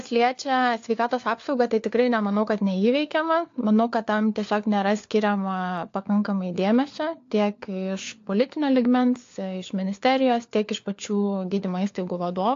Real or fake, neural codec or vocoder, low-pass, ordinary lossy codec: fake; codec, 16 kHz, 4 kbps, FunCodec, trained on LibriTTS, 50 frames a second; 7.2 kHz; AAC, 48 kbps